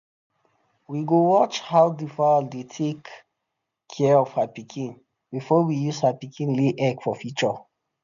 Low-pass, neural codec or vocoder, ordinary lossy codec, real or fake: 7.2 kHz; none; MP3, 96 kbps; real